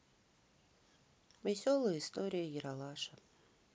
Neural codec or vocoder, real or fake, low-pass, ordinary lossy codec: none; real; none; none